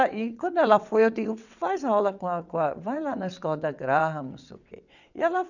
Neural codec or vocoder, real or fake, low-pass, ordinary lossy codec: vocoder, 22.05 kHz, 80 mel bands, WaveNeXt; fake; 7.2 kHz; none